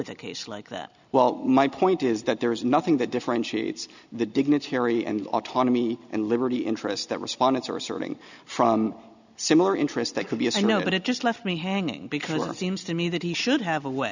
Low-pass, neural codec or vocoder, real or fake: 7.2 kHz; none; real